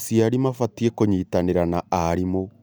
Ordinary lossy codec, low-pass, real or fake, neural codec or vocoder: none; none; real; none